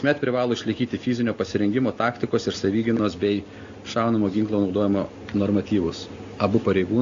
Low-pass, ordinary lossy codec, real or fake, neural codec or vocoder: 7.2 kHz; AAC, 64 kbps; real; none